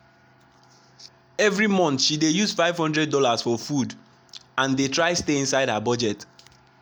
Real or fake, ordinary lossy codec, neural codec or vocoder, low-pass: real; none; none; none